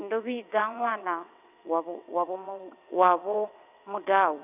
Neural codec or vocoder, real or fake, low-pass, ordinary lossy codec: vocoder, 22.05 kHz, 80 mel bands, WaveNeXt; fake; 3.6 kHz; none